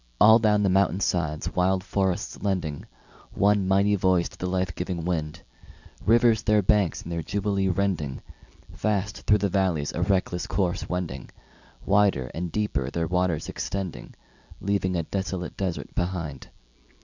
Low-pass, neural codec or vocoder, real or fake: 7.2 kHz; none; real